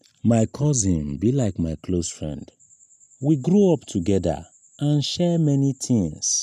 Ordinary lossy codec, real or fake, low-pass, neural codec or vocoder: none; real; 14.4 kHz; none